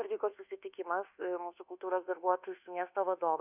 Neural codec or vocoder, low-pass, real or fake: codec, 24 kHz, 1.2 kbps, DualCodec; 3.6 kHz; fake